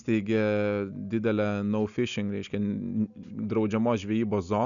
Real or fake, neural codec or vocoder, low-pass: real; none; 7.2 kHz